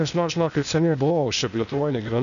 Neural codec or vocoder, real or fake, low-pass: codec, 16 kHz, 0.8 kbps, ZipCodec; fake; 7.2 kHz